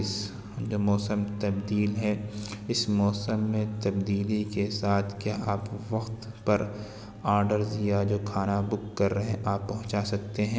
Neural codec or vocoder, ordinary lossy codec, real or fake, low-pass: none; none; real; none